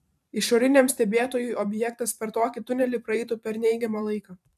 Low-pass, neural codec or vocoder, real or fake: 14.4 kHz; vocoder, 44.1 kHz, 128 mel bands every 512 samples, BigVGAN v2; fake